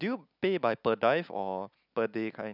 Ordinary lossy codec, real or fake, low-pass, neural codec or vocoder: none; real; 5.4 kHz; none